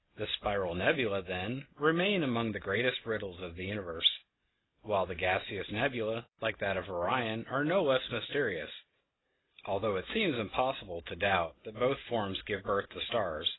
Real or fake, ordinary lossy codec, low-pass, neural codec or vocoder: real; AAC, 16 kbps; 7.2 kHz; none